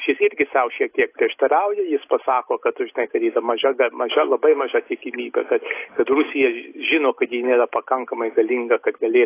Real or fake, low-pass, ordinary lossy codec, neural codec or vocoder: real; 3.6 kHz; AAC, 24 kbps; none